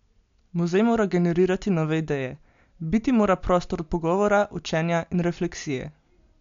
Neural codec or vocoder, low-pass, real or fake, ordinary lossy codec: none; 7.2 kHz; real; MP3, 64 kbps